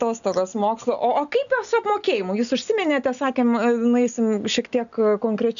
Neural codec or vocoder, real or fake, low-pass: none; real; 7.2 kHz